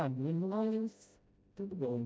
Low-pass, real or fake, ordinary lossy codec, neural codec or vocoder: none; fake; none; codec, 16 kHz, 0.5 kbps, FreqCodec, smaller model